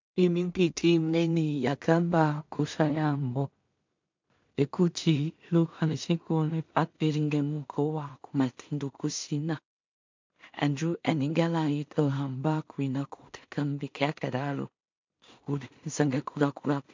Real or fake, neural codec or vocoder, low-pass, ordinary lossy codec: fake; codec, 16 kHz in and 24 kHz out, 0.4 kbps, LongCat-Audio-Codec, two codebook decoder; 7.2 kHz; MP3, 64 kbps